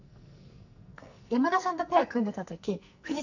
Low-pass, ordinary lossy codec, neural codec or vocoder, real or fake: 7.2 kHz; none; codec, 44.1 kHz, 2.6 kbps, SNAC; fake